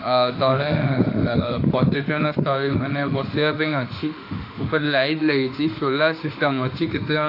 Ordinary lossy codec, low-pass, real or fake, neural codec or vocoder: AAC, 32 kbps; 5.4 kHz; fake; autoencoder, 48 kHz, 32 numbers a frame, DAC-VAE, trained on Japanese speech